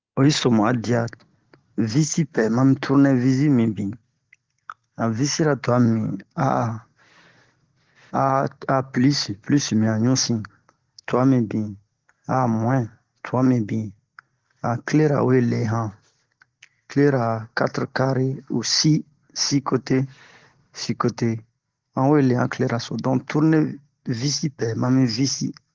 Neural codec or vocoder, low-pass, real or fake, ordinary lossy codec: none; 7.2 kHz; real; Opus, 16 kbps